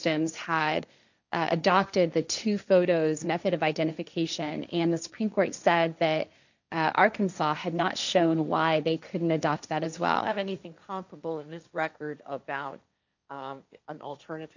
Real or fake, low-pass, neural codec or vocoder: fake; 7.2 kHz; codec, 16 kHz, 1.1 kbps, Voila-Tokenizer